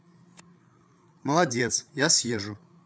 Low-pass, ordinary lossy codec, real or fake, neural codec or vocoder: none; none; fake; codec, 16 kHz, 8 kbps, FreqCodec, larger model